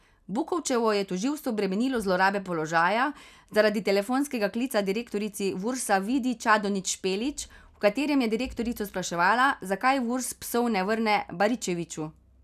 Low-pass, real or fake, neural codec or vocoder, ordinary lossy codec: 14.4 kHz; real; none; none